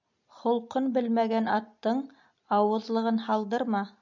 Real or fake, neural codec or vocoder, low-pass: real; none; 7.2 kHz